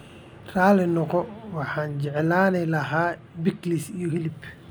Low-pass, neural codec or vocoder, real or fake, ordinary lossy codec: none; none; real; none